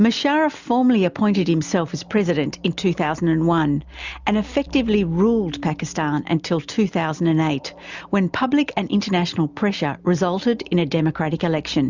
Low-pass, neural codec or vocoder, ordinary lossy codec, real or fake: 7.2 kHz; none; Opus, 64 kbps; real